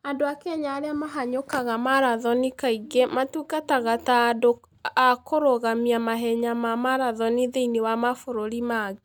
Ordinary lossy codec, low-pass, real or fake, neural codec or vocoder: none; none; real; none